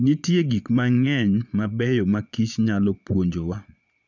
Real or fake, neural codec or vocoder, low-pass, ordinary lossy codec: fake; vocoder, 22.05 kHz, 80 mel bands, Vocos; 7.2 kHz; none